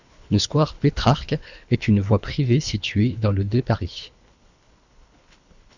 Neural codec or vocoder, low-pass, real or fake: codec, 24 kHz, 3 kbps, HILCodec; 7.2 kHz; fake